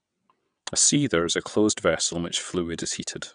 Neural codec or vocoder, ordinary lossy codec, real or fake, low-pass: vocoder, 22.05 kHz, 80 mel bands, WaveNeXt; none; fake; 9.9 kHz